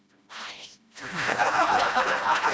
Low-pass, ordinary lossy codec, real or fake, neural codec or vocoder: none; none; fake; codec, 16 kHz, 1 kbps, FreqCodec, smaller model